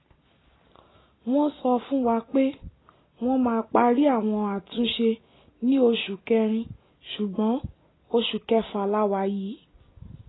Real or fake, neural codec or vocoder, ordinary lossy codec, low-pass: real; none; AAC, 16 kbps; 7.2 kHz